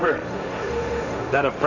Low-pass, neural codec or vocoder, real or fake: 7.2 kHz; codec, 16 kHz, 1.1 kbps, Voila-Tokenizer; fake